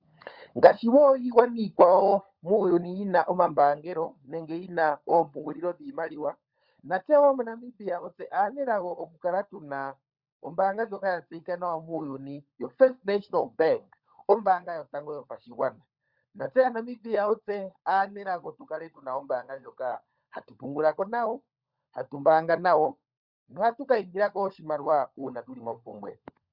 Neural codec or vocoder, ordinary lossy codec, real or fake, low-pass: codec, 16 kHz, 16 kbps, FunCodec, trained on LibriTTS, 50 frames a second; Opus, 64 kbps; fake; 5.4 kHz